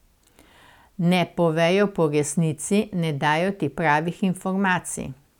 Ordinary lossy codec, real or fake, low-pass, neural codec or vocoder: none; real; 19.8 kHz; none